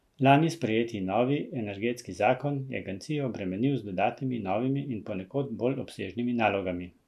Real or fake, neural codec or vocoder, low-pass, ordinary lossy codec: real; none; 14.4 kHz; none